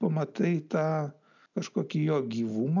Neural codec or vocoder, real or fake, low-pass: none; real; 7.2 kHz